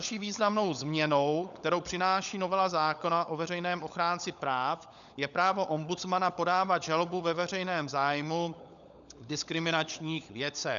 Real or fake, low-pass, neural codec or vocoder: fake; 7.2 kHz; codec, 16 kHz, 16 kbps, FunCodec, trained on LibriTTS, 50 frames a second